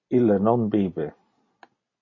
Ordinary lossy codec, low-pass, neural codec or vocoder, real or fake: MP3, 32 kbps; 7.2 kHz; none; real